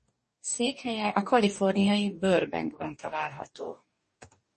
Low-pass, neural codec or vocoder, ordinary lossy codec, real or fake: 10.8 kHz; codec, 44.1 kHz, 2.6 kbps, DAC; MP3, 32 kbps; fake